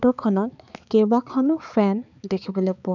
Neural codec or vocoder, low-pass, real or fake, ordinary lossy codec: codec, 16 kHz, 4 kbps, X-Codec, HuBERT features, trained on balanced general audio; 7.2 kHz; fake; none